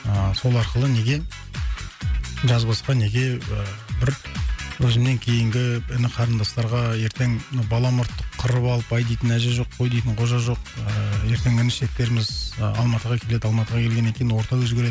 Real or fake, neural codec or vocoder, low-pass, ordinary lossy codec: real; none; none; none